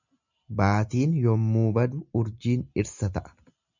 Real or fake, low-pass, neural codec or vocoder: real; 7.2 kHz; none